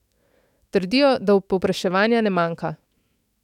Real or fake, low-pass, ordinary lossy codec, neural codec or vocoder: fake; 19.8 kHz; none; autoencoder, 48 kHz, 128 numbers a frame, DAC-VAE, trained on Japanese speech